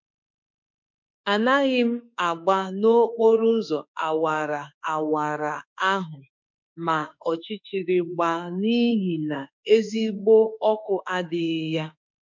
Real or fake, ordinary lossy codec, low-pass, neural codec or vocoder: fake; MP3, 48 kbps; 7.2 kHz; autoencoder, 48 kHz, 32 numbers a frame, DAC-VAE, trained on Japanese speech